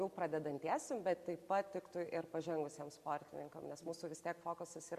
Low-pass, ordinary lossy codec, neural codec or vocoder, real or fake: 14.4 kHz; Opus, 64 kbps; none; real